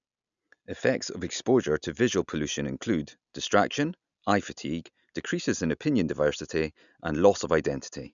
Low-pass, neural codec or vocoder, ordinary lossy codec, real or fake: 7.2 kHz; none; none; real